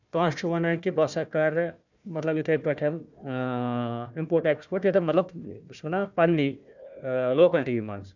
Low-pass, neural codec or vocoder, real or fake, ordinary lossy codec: 7.2 kHz; codec, 16 kHz, 1 kbps, FunCodec, trained on Chinese and English, 50 frames a second; fake; none